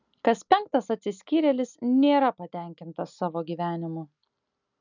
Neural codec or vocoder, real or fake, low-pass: none; real; 7.2 kHz